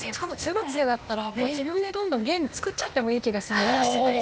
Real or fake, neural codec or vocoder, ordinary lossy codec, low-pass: fake; codec, 16 kHz, 0.8 kbps, ZipCodec; none; none